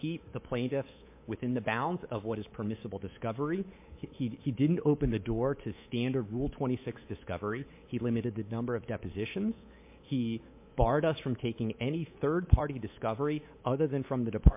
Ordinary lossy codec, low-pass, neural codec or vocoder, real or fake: MP3, 24 kbps; 3.6 kHz; codec, 24 kHz, 3.1 kbps, DualCodec; fake